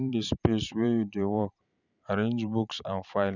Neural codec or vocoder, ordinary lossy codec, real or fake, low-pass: vocoder, 44.1 kHz, 128 mel bands every 512 samples, BigVGAN v2; none; fake; 7.2 kHz